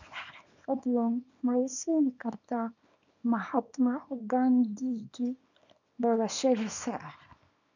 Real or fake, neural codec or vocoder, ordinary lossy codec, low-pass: fake; codec, 24 kHz, 0.9 kbps, WavTokenizer, small release; none; 7.2 kHz